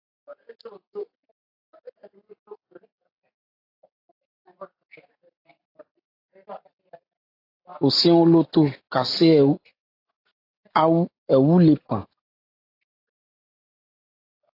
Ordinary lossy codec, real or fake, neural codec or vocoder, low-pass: AAC, 32 kbps; real; none; 5.4 kHz